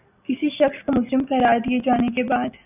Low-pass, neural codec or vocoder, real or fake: 3.6 kHz; none; real